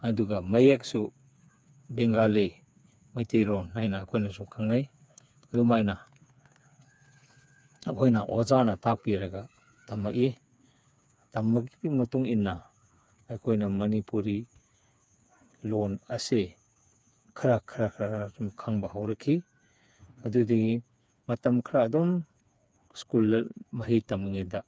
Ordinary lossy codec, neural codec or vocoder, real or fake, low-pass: none; codec, 16 kHz, 4 kbps, FreqCodec, smaller model; fake; none